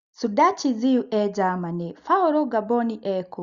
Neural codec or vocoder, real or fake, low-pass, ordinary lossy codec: none; real; 7.2 kHz; Opus, 64 kbps